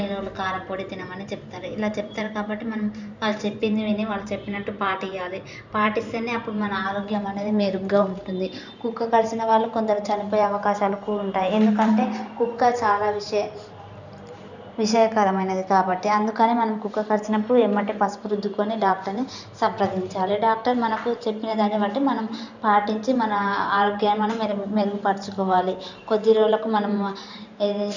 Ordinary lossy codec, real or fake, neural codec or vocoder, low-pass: none; fake; vocoder, 44.1 kHz, 128 mel bands every 512 samples, BigVGAN v2; 7.2 kHz